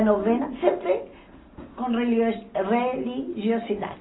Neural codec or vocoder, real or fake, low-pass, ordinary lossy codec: none; real; 7.2 kHz; AAC, 16 kbps